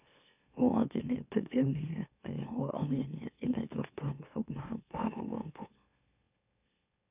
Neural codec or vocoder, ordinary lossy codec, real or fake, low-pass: autoencoder, 44.1 kHz, a latent of 192 numbers a frame, MeloTTS; none; fake; 3.6 kHz